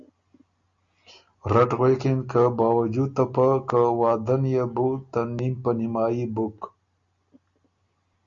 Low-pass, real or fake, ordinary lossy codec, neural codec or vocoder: 7.2 kHz; real; Opus, 64 kbps; none